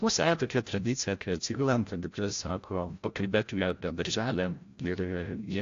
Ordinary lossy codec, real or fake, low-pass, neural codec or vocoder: AAC, 48 kbps; fake; 7.2 kHz; codec, 16 kHz, 0.5 kbps, FreqCodec, larger model